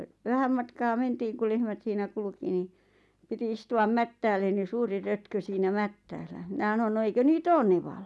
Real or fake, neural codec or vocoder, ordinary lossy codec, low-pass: real; none; none; none